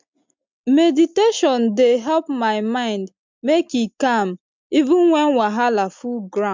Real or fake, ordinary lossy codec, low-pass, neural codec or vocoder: real; MP3, 64 kbps; 7.2 kHz; none